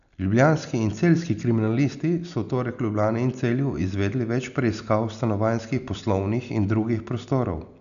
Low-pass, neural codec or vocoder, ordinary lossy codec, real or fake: 7.2 kHz; none; none; real